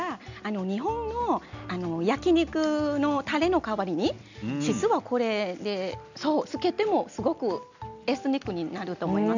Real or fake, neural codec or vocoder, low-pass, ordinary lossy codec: real; none; 7.2 kHz; none